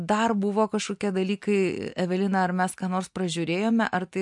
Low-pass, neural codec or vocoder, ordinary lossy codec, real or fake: 10.8 kHz; none; MP3, 64 kbps; real